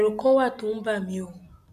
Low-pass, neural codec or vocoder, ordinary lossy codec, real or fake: 14.4 kHz; none; none; real